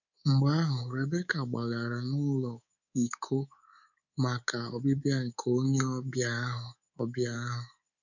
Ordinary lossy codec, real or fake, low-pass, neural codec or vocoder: none; fake; 7.2 kHz; codec, 24 kHz, 3.1 kbps, DualCodec